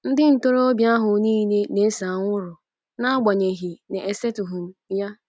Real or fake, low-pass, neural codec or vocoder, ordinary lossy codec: real; none; none; none